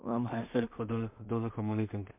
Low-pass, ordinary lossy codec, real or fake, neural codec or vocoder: 3.6 kHz; MP3, 32 kbps; fake; codec, 16 kHz in and 24 kHz out, 0.4 kbps, LongCat-Audio-Codec, two codebook decoder